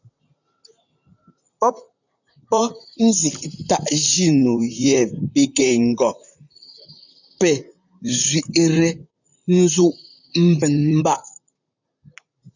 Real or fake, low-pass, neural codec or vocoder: fake; 7.2 kHz; vocoder, 44.1 kHz, 128 mel bands, Pupu-Vocoder